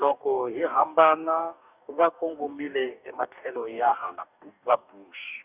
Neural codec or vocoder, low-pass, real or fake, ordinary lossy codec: codec, 44.1 kHz, 2.6 kbps, DAC; 3.6 kHz; fake; none